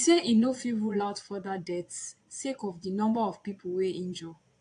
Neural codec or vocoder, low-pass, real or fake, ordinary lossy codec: vocoder, 22.05 kHz, 80 mel bands, Vocos; 9.9 kHz; fake; AAC, 48 kbps